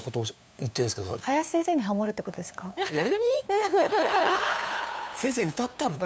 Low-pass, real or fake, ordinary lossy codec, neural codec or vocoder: none; fake; none; codec, 16 kHz, 2 kbps, FunCodec, trained on LibriTTS, 25 frames a second